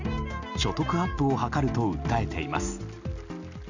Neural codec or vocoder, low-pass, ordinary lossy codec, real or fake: none; 7.2 kHz; Opus, 64 kbps; real